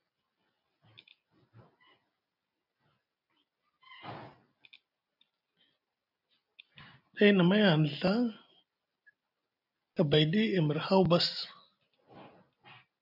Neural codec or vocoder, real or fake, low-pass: none; real; 5.4 kHz